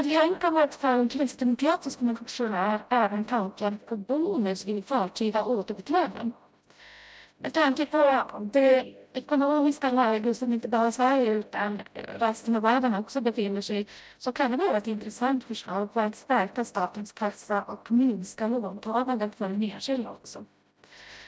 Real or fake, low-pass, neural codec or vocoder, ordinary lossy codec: fake; none; codec, 16 kHz, 0.5 kbps, FreqCodec, smaller model; none